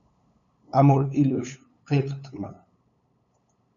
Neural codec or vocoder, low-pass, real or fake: codec, 16 kHz, 8 kbps, FunCodec, trained on LibriTTS, 25 frames a second; 7.2 kHz; fake